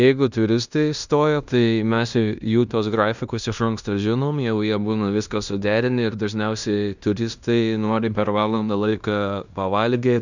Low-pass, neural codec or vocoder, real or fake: 7.2 kHz; codec, 16 kHz in and 24 kHz out, 0.9 kbps, LongCat-Audio-Codec, fine tuned four codebook decoder; fake